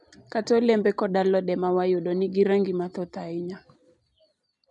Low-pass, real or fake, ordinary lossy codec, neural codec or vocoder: 10.8 kHz; real; none; none